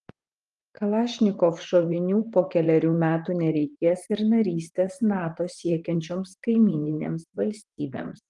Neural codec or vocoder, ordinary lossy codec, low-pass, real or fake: vocoder, 44.1 kHz, 128 mel bands, Pupu-Vocoder; Opus, 24 kbps; 10.8 kHz; fake